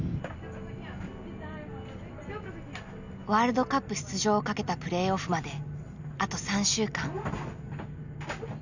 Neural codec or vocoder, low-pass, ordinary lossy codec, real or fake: none; 7.2 kHz; none; real